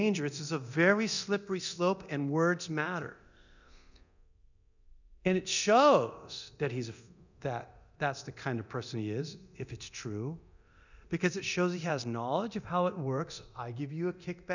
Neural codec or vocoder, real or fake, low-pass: codec, 24 kHz, 0.9 kbps, DualCodec; fake; 7.2 kHz